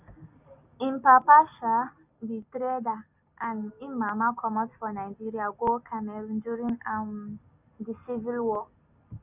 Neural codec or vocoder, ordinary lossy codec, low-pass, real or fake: none; none; 3.6 kHz; real